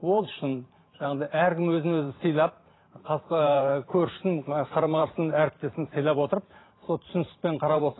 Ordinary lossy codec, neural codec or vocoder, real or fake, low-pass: AAC, 16 kbps; vocoder, 44.1 kHz, 128 mel bands every 512 samples, BigVGAN v2; fake; 7.2 kHz